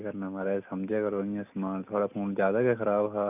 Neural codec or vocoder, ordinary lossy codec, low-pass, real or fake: none; none; 3.6 kHz; real